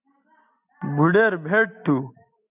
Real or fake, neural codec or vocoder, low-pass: real; none; 3.6 kHz